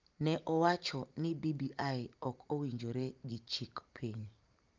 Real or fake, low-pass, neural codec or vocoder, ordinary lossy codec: fake; 7.2 kHz; vocoder, 44.1 kHz, 128 mel bands every 512 samples, BigVGAN v2; Opus, 24 kbps